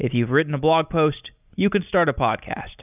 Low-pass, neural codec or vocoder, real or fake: 3.6 kHz; none; real